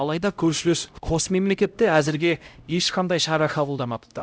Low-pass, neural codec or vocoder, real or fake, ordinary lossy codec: none; codec, 16 kHz, 0.5 kbps, X-Codec, HuBERT features, trained on LibriSpeech; fake; none